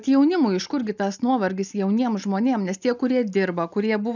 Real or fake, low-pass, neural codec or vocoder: real; 7.2 kHz; none